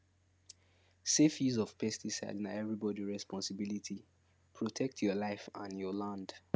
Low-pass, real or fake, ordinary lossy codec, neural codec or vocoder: none; real; none; none